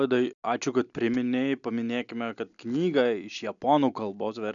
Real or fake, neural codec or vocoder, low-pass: real; none; 7.2 kHz